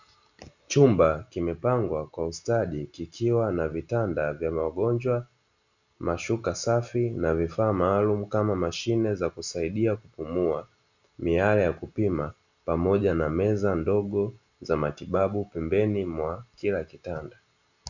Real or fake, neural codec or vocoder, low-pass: real; none; 7.2 kHz